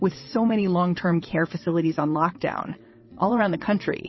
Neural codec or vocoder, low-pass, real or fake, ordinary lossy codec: vocoder, 22.05 kHz, 80 mel bands, WaveNeXt; 7.2 kHz; fake; MP3, 24 kbps